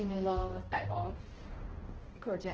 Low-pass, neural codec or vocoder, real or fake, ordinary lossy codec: 7.2 kHz; codec, 16 kHz, 1 kbps, X-Codec, HuBERT features, trained on balanced general audio; fake; Opus, 16 kbps